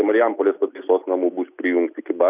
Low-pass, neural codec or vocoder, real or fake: 3.6 kHz; none; real